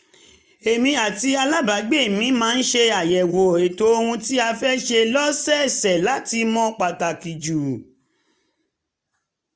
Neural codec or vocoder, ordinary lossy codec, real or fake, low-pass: none; none; real; none